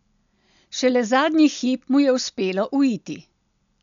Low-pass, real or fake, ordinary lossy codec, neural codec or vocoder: 7.2 kHz; real; none; none